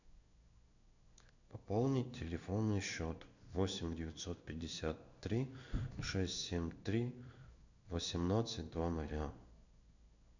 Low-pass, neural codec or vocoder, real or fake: 7.2 kHz; codec, 16 kHz in and 24 kHz out, 1 kbps, XY-Tokenizer; fake